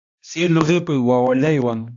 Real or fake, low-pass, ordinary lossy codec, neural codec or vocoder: fake; 7.2 kHz; AAC, 64 kbps; codec, 16 kHz, 1 kbps, X-Codec, HuBERT features, trained on balanced general audio